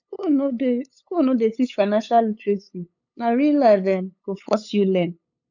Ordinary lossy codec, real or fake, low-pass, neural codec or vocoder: none; fake; 7.2 kHz; codec, 16 kHz, 8 kbps, FunCodec, trained on LibriTTS, 25 frames a second